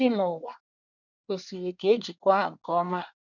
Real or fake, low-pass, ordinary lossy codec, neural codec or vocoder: fake; 7.2 kHz; none; codec, 24 kHz, 1 kbps, SNAC